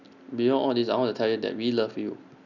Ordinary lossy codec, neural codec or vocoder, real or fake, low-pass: none; none; real; 7.2 kHz